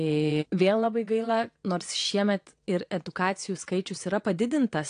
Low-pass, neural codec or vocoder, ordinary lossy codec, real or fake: 9.9 kHz; vocoder, 22.05 kHz, 80 mel bands, WaveNeXt; AAC, 48 kbps; fake